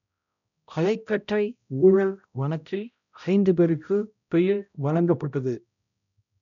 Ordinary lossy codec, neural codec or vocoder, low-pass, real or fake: none; codec, 16 kHz, 0.5 kbps, X-Codec, HuBERT features, trained on balanced general audio; 7.2 kHz; fake